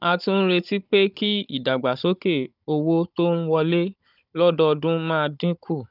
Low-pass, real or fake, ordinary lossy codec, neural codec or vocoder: 5.4 kHz; fake; none; codec, 16 kHz, 16 kbps, FunCodec, trained on Chinese and English, 50 frames a second